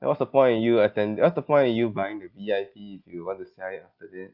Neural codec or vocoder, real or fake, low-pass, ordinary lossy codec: none; real; 5.4 kHz; Opus, 24 kbps